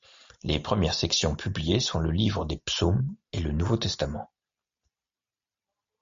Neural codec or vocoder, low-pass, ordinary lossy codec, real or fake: none; 7.2 kHz; MP3, 48 kbps; real